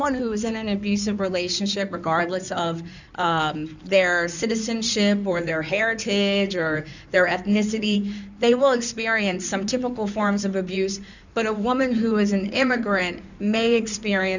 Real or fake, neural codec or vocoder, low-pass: fake; codec, 16 kHz in and 24 kHz out, 2.2 kbps, FireRedTTS-2 codec; 7.2 kHz